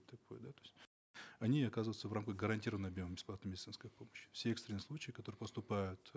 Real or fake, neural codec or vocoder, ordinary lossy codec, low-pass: real; none; none; none